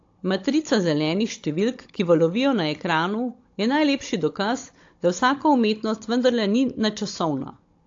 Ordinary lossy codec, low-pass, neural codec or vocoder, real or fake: AAC, 48 kbps; 7.2 kHz; codec, 16 kHz, 16 kbps, FunCodec, trained on Chinese and English, 50 frames a second; fake